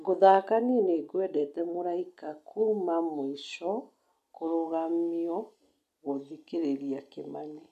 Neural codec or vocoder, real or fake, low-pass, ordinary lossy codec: none; real; 14.4 kHz; none